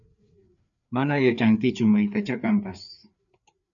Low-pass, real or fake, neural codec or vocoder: 7.2 kHz; fake; codec, 16 kHz, 4 kbps, FreqCodec, larger model